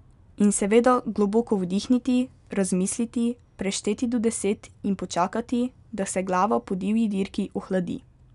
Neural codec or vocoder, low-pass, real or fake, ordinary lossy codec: none; 10.8 kHz; real; none